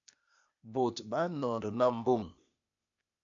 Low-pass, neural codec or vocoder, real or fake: 7.2 kHz; codec, 16 kHz, 0.8 kbps, ZipCodec; fake